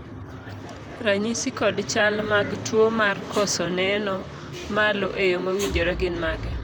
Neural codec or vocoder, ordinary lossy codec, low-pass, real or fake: vocoder, 44.1 kHz, 128 mel bands, Pupu-Vocoder; none; none; fake